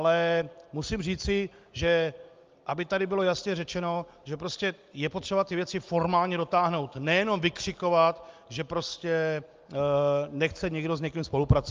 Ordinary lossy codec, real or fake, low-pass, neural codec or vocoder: Opus, 24 kbps; real; 7.2 kHz; none